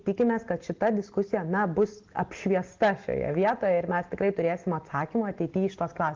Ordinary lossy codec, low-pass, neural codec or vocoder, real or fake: Opus, 16 kbps; 7.2 kHz; none; real